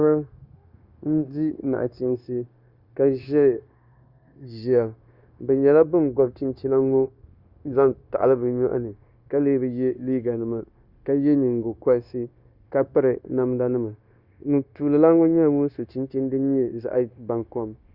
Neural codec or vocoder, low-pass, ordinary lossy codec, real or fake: codec, 16 kHz, 0.9 kbps, LongCat-Audio-Codec; 5.4 kHz; Opus, 64 kbps; fake